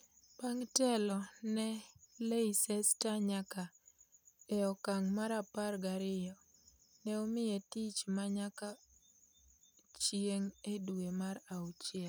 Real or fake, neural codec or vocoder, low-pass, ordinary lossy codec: real; none; none; none